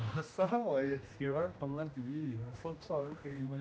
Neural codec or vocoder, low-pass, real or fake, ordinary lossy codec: codec, 16 kHz, 1 kbps, X-Codec, HuBERT features, trained on general audio; none; fake; none